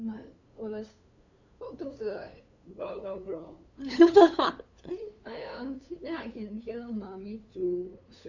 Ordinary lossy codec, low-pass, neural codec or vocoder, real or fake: none; 7.2 kHz; codec, 16 kHz, 2 kbps, FunCodec, trained on LibriTTS, 25 frames a second; fake